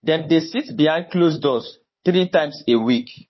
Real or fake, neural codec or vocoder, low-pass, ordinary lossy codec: fake; codec, 16 kHz, 4 kbps, FunCodec, trained on Chinese and English, 50 frames a second; 7.2 kHz; MP3, 24 kbps